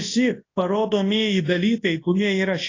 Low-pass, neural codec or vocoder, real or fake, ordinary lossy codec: 7.2 kHz; codec, 24 kHz, 0.9 kbps, WavTokenizer, large speech release; fake; AAC, 32 kbps